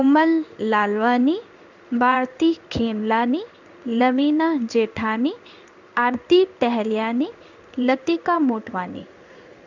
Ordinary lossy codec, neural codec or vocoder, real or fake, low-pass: none; codec, 16 kHz in and 24 kHz out, 1 kbps, XY-Tokenizer; fake; 7.2 kHz